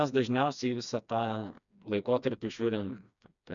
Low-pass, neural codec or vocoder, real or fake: 7.2 kHz; codec, 16 kHz, 2 kbps, FreqCodec, smaller model; fake